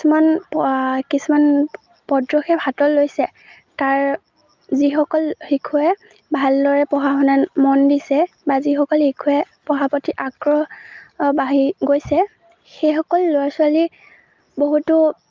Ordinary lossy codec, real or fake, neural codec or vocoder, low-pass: Opus, 24 kbps; real; none; 7.2 kHz